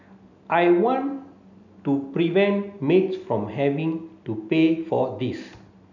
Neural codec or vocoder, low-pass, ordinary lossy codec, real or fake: none; 7.2 kHz; none; real